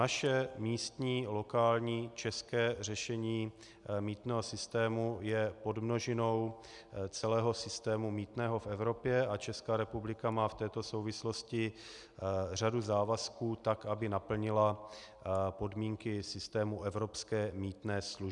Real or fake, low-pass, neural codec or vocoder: real; 10.8 kHz; none